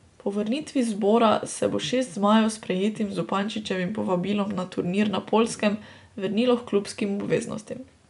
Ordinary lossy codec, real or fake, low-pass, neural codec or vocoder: none; real; 10.8 kHz; none